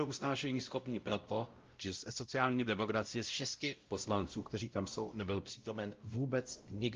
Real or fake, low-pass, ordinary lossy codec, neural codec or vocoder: fake; 7.2 kHz; Opus, 24 kbps; codec, 16 kHz, 0.5 kbps, X-Codec, WavLM features, trained on Multilingual LibriSpeech